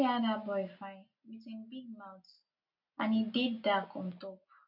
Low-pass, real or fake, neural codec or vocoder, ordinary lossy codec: 5.4 kHz; real; none; none